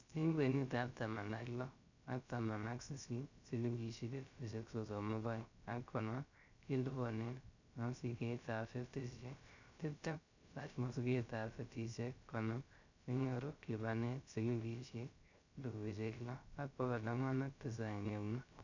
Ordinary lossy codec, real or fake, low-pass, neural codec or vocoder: none; fake; 7.2 kHz; codec, 16 kHz, 0.3 kbps, FocalCodec